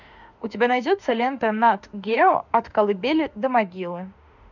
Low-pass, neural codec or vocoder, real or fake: 7.2 kHz; autoencoder, 48 kHz, 32 numbers a frame, DAC-VAE, trained on Japanese speech; fake